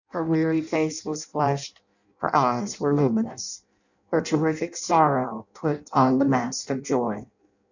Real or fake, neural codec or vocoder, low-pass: fake; codec, 16 kHz in and 24 kHz out, 0.6 kbps, FireRedTTS-2 codec; 7.2 kHz